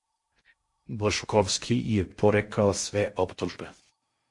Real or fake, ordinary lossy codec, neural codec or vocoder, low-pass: fake; MP3, 48 kbps; codec, 16 kHz in and 24 kHz out, 0.6 kbps, FocalCodec, streaming, 2048 codes; 10.8 kHz